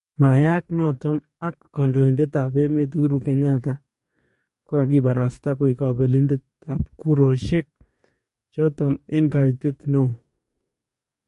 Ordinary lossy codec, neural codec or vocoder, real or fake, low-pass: MP3, 48 kbps; codec, 44.1 kHz, 2.6 kbps, DAC; fake; 14.4 kHz